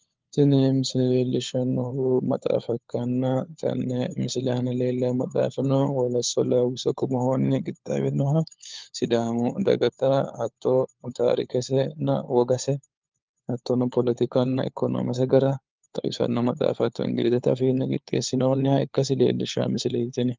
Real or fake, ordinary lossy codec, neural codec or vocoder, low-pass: fake; Opus, 32 kbps; codec, 16 kHz, 4 kbps, FunCodec, trained on LibriTTS, 50 frames a second; 7.2 kHz